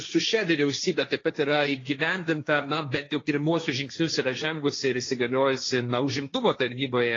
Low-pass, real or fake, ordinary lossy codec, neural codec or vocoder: 7.2 kHz; fake; AAC, 32 kbps; codec, 16 kHz, 1.1 kbps, Voila-Tokenizer